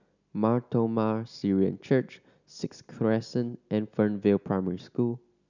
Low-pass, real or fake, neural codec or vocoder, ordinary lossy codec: 7.2 kHz; real; none; none